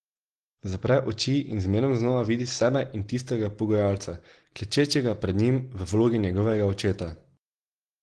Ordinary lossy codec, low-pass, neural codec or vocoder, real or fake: Opus, 16 kbps; 9.9 kHz; none; real